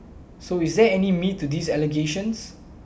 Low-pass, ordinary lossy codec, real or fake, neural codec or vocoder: none; none; real; none